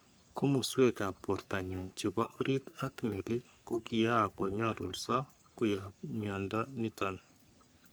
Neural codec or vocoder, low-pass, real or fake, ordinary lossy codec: codec, 44.1 kHz, 3.4 kbps, Pupu-Codec; none; fake; none